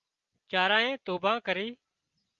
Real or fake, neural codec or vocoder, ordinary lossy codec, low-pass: real; none; Opus, 32 kbps; 7.2 kHz